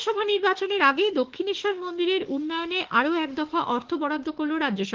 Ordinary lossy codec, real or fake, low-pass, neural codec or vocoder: Opus, 16 kbps; fake; 7.2 kHz; autoencoder, 48 kHz, 32 numbers a frame, DAC-VAE, trained on Japanese speech